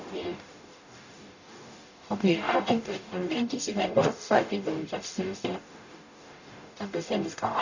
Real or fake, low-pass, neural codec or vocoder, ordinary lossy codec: fake; 7.2 kHz; codec, 44.1 kHz, 0.9 kbps, DAC; none